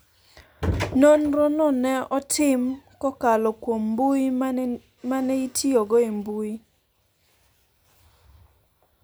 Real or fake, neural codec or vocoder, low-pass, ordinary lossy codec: real; none; none; none